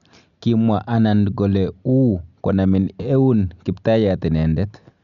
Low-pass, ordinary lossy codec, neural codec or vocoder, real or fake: 7.2 kHz; none; none; real